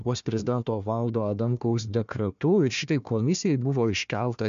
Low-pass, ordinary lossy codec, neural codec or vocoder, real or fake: 7.2 kHz; MP3, 64 kbps; codec, 16 kHz, 1 kbps, FunCodec, trained on Chinese and English, 50 frames a second; fake